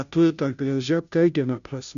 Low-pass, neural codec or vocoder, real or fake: 7.2 kHz; codec, 16 kHz, 0.5 kbps, FunCodec, trained on Chinese and English, 25 frames a second; fake